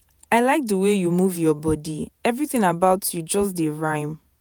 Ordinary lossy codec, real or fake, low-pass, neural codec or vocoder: none; fake; none; vocoder, 48 kHz, 128 mel bands, Vocos